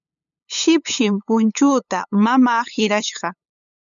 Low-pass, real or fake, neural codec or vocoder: 7.2 kHz; fake; codec, 16 kHz, 8 kbps, FunCodec, trained on LibriTTS, 25 frames a second